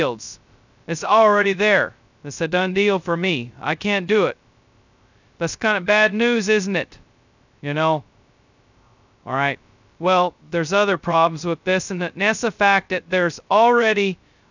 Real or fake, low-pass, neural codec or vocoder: fake; 7.2 kHz; codec, 16 kHz, 0.2 kbps, FocalCodec